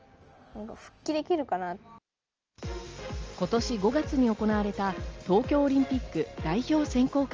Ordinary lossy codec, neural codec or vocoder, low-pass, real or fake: Opus, 24 kbps; none; 7.2 kHz; real